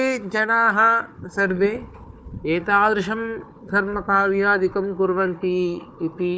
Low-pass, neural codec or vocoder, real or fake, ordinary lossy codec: none; codec, 16 kHz, 4 kbps, FunCodec, trained on Chinese and English, 50 frames a second; fake; none